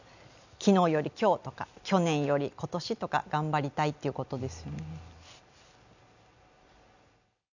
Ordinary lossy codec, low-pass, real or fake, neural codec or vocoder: none; 7.2 kHz; real; none